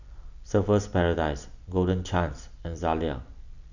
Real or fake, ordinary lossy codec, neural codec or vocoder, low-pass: real; none; none; 7.2 kHz